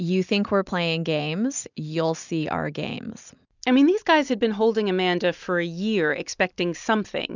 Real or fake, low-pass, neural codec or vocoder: real; 7.2 kHz; none